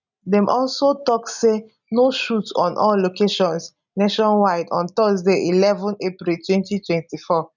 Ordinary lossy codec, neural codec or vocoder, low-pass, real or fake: none; none; 7.2 kHz; real